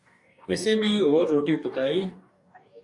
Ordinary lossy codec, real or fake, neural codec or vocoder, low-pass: AAC, 64 kbps; fake; codec, 44.1 kHz, 2.6 kbps, DAC; 10.8 kHz